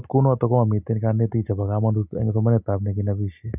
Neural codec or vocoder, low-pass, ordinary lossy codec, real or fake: none; 3.6 kHz; none; real